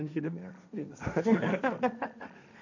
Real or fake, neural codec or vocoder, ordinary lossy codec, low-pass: fake; codec, 16 kHz, 1.1 kbps, Voila-Tokenizer; none; 7.2 kHz